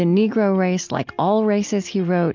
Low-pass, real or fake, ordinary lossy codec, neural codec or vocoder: 7.2 kHz; real; MP3, 64 kbps; none